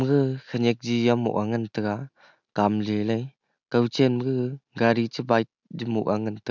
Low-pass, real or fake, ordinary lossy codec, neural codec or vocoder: 7.2 kHz; real; none; none